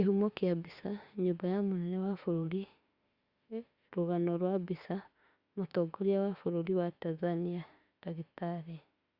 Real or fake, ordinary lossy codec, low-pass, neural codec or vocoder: fake; Opus, 64 kbps; 5.4 kHz; autoencoder, 48 kHz, 32 numbers a frame, DAC-VAE, trained on Japanese speech